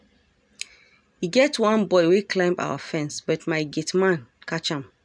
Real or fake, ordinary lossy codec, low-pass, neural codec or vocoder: real; none; 9.9 kHz; none